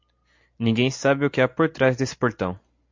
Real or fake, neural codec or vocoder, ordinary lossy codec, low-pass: real; none; MP3, 48 kbps; 7.2 kHz